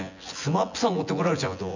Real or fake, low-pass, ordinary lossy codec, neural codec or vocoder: fake; 7.2 kHz; none; vocoder, 24 kHz, 100 mel bands, Vocos